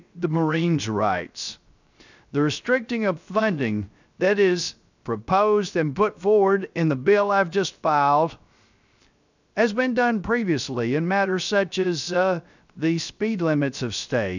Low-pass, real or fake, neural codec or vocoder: 7.2 kHz; fake; codec, 16 kHz, 0.3 kbps, FocalCodec